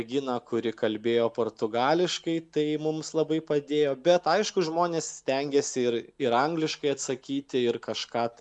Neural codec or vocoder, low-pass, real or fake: none; 10.8 kHz; real